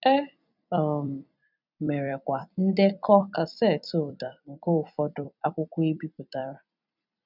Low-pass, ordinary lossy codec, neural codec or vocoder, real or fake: 5.4 kHz; none; vocoder, 44.1 kHz, 128 mel bands every 256 samples, BigVGAN v2; fake